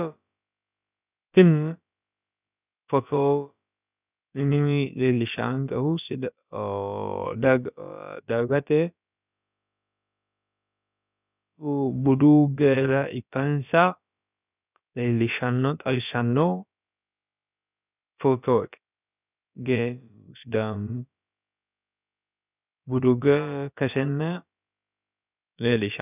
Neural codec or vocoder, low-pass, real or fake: codec, 16 kHz, about 1 kbps, DyCAST, with the encoder's durations; 3.6 kHz; fake